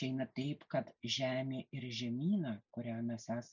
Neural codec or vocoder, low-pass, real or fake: none; 7.2 kHz; real